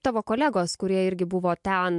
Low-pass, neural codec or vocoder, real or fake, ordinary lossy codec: 10.8 kHz; none; real; MP3, 64 kbps